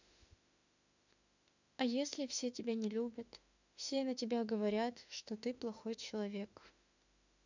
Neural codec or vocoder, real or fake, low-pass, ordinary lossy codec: autoencoder, 48 kHz, 32 numbers a frame, DAC-VAE, trained on Japanese speech; fake; 7.2 kHz; none